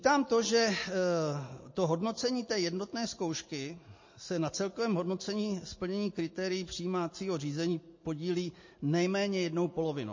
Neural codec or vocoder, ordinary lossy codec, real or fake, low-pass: none; MP3, 32 kbps; real; 7.2 kHz